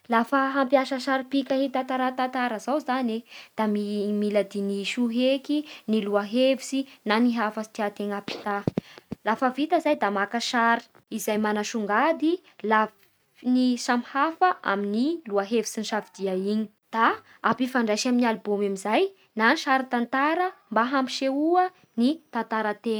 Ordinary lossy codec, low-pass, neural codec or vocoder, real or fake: none; none; none; real